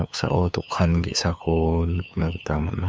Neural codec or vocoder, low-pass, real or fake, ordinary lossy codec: codec, 16 kHz, 2 kbps, FunCodec, trained on LibriTTS, 25 frames a second; none; fake; none